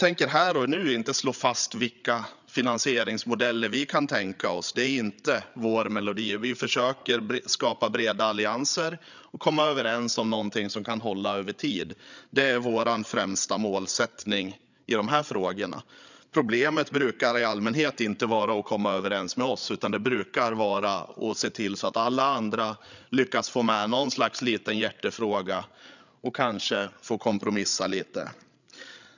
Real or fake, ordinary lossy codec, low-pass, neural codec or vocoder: fake; none; 7.2 kHz; codec, 16 kHz, 8 kbps, FreqCodec, larger model